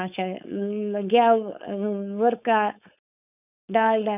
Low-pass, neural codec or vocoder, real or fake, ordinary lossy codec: 3.6 kHz; codec, 16 kHz, 4.8 kbps, FACodec; fake; none